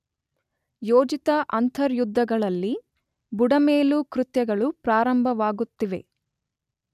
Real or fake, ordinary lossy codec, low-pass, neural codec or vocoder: real; none; 14.4 kHz; none